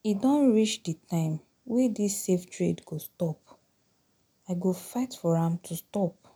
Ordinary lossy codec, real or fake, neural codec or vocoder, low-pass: none; real; none; none